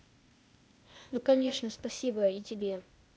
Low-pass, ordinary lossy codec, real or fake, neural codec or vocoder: none; none; fake; codec, 16 kHz, 0.8 kbps, ZipCodec